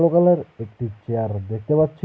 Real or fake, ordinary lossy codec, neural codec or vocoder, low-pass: real; none; none; none